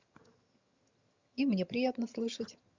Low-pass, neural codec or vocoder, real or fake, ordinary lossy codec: 7.2 kHz; vocoder, 22.05 kHz, 80 mel bands, HiFi-GAN; fake; Opus, 64 kbps